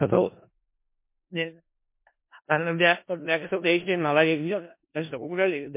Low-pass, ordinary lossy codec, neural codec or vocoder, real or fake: 3.6 kHz; MP3, 24 kbps; codec, 16 kHz in and 24 kHz out, 0.4 kbps, LongCat-Audio-Codec, four codebook decoder; fake